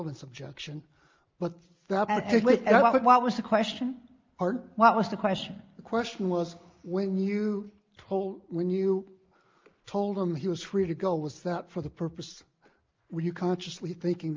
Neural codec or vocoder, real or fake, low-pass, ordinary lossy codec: none; real; 7.2 kHz; Opus, 24 kbps